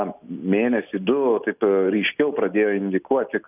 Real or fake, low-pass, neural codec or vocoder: real; 3.6 kHz; none